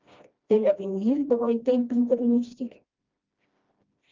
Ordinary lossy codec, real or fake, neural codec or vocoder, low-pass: Opus, 32 kbps; fake; codec, 16 kHz, 1 kbps, FreqCodec, smaller model; 7.2 kHz